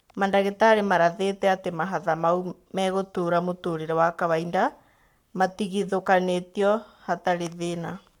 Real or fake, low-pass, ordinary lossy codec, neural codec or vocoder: fake; 19.8 kHz; none; codec, 44.1 kHz, 7.8 kbps, Pupu-Codec